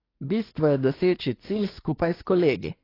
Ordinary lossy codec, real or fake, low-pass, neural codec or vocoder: AAC, 24 kbps; fake; 5.4 kHz; codec, 24 kHz, 1 kbps, SNAC